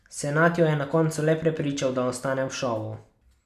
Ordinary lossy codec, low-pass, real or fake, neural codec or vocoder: none; 14.4 kHz; real; none